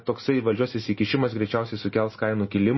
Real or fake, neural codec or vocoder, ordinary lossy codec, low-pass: real; none; MP3, 24 kbps; 7.2 kHz